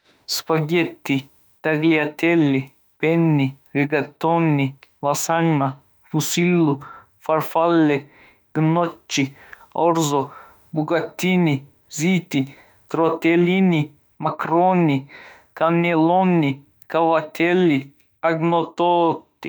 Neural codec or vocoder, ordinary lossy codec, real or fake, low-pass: autoencoder, 48 kHz, 32 numbers a frame, DAC-VAE, trained on Japanese speech; none; fake; none